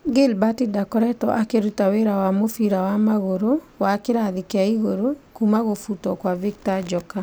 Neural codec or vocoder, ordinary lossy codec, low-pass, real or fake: none; none; none; real